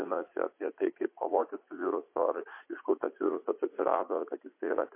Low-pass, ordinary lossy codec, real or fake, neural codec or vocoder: 3.6 kHz; MP3, 24 kbps; fake; vocoder, 44.1 kHz, 80 mel bands, Vocos